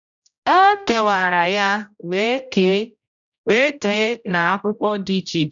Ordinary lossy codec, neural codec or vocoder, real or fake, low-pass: none; codec, 16 kHz, 0.5 kbps, X-Codec, HuBERT features, trained on general audio; fake; 7.2 kHz